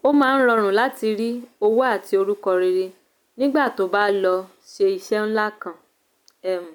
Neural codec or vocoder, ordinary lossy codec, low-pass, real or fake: none; none; 19.8 kHz; real